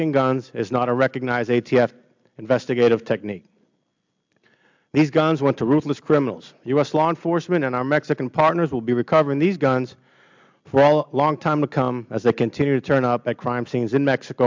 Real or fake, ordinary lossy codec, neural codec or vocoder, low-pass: real; MP3, 64 kbps; none; 7.2 kHz